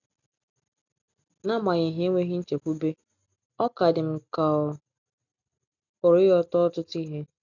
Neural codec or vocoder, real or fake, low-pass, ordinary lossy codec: none; real; 7.2 kHz; none